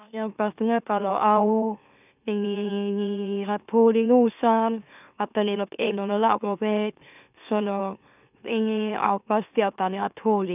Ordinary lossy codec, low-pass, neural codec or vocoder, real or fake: none; 3.6 kHz; autoencoder, 44.1 kHz, a latent of 192 numbers a frame, MeloTTS; fake